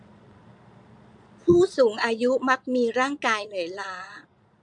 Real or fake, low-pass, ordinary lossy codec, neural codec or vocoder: fake; 9.9 kHz; MP3, 64 kbps; vocoder, 22.05 kHz, 80 mel bands, Vocos